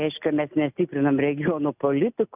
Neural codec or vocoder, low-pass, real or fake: none; 3.6 kHz; real